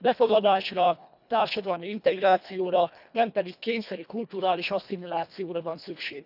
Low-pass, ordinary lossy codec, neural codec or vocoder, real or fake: 5.4 kHz; none; codec, 24 kHz, 1.5 kbps, HILCodec; fake